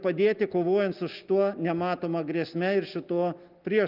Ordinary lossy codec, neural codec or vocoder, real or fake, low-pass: Opus, 32 kbps; none; real; 5.4 kHz